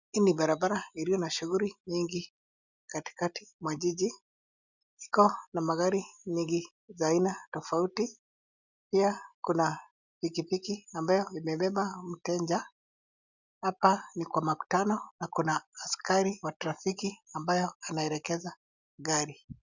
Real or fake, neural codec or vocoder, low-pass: real; none; 7.2 kHz